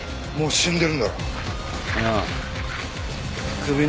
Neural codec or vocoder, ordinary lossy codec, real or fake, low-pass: none; none; real; none